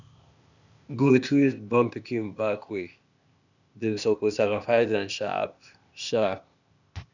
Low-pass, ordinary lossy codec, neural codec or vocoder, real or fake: 7.2 kHz; none; codec, 16 kHz, 0.8 kbps, ZipCodec; fake